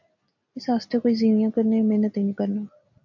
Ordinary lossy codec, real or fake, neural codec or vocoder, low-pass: MP3, 48 kbps; real; none; 7.2 kHz